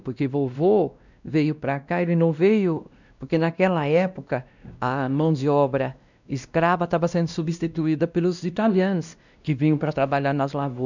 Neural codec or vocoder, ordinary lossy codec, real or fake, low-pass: codec, 16 kHz, 1 kbps, X-Codec, WavLM features, trained on Multilingual LibriSpeech; none; fake; 7.2 kHz